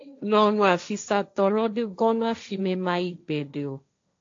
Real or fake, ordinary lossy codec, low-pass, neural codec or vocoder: fake; AAC, 48 kbps; 7.2 kHz; codec, 16 kHz, 1.1 kbps, Voila-Tokenizer